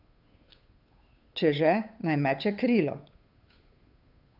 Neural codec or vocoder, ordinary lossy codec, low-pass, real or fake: codec, 16 kHz, 8 kbps, FunCodec, trained on Chinese and English, 25 frames a second; AAC, 48 kbps; 5.4 kHz; fake